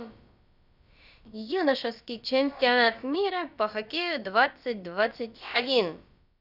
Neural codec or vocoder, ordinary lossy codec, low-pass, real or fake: codec, 16 kHz, about 1 kbps, DyCAST, with the encoder's durations; none; 5.4 kHz; fake